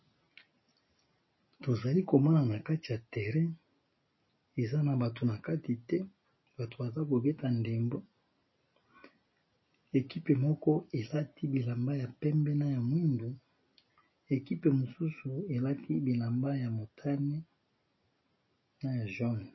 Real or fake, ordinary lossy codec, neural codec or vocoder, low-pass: real; MP3, 24 kbps; none; 7.2 kHz